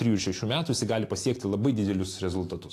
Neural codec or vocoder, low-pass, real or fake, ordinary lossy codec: none; 14.4 kHz; real; AAC, 64 kbps